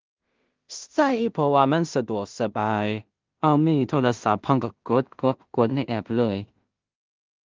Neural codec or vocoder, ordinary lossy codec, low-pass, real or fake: codec, 16 kHz in and 24 kHz out, 0.4 kbps, LongCat-Audio-Codec, two codebook decoder; Opus, 32 kbps; 7.2 kHz; fake